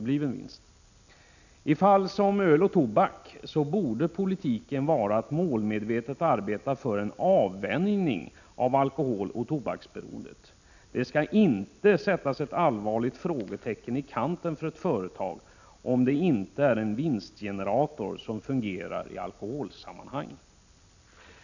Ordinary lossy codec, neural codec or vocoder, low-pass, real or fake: none; none; 7.2 kHz; real